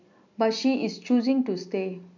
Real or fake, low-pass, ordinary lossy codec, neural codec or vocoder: real; 7.2 kHz; MP3, 64 kbps; none